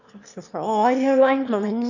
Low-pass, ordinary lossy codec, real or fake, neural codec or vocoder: 7.2 kHz; none; fake; autoencoder, 22.05 kHz, a latent of 192 numbers a frame, VITS, trained on one speaker